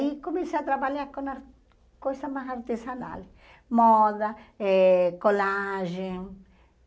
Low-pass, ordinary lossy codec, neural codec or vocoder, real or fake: none; none; none; real